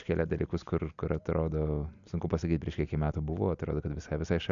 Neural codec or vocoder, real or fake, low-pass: none; real; 7.2 kHz